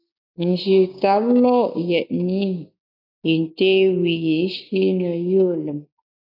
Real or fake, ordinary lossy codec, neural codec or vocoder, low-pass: fake; AAC, 48 kbps; codec, 16 kHz, 6 kbps, DAC; 5.4 kHz